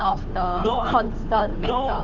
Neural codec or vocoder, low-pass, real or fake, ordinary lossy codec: codec, 16 kHz, 2 kbps, FunCodec, trained on Chinese and English, 25 frames a second; 7.2 kHz; fake; none